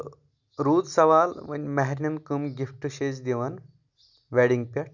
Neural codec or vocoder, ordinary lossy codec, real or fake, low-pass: none; none; real; 7.2 kHz